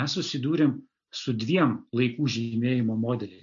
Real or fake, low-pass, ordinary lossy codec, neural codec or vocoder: real; 7.2 kHz; AAC, 64 kbps; none